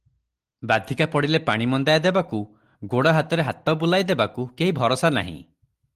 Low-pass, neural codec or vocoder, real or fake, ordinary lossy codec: 14.4 kHz; none; real; Opus, 24 kbps